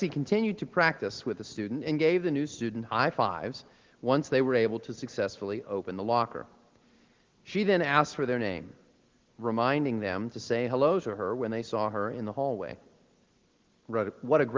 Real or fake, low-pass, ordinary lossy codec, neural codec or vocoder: real; 7.2 kHz; Opus, 16 kbps; none